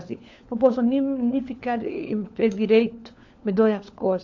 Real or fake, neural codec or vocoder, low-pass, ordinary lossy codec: fake; codec, 16 kHz, 4 kbps, FunCodec, trained on LibriTTS, 50 frames a second; 7.2 kHz; AAC, 48 kbps